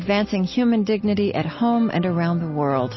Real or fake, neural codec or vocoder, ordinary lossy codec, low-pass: real; none; MP3, 24 kbps; 7.2 kHz